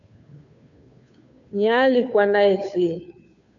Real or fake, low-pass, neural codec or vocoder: fake; 7.2 kHz; codec, 16 kHz, 2 kbps, FunCodec, trained on Chinese and English, 25 frames a second